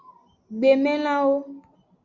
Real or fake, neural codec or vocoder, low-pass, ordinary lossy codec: real; none; 7.2 kHz; Opus, 64 kbps